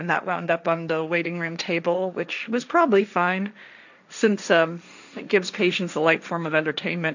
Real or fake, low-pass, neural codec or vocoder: fake; 7.2 kHz; codec, 16 kHz, 1.1 kbps, Voila-Tokenizer